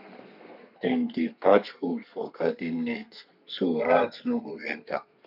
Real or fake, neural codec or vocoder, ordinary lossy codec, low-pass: fake; codec, 32 kHz, 1.9 kbps, SNAC; none; 5.4 kHz